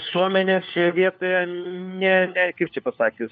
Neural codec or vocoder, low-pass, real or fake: codec, 16 kHz, 4 kbps, FunCodec, trained on LibriTTS, 50 frames a second; 7.2 kHz; fake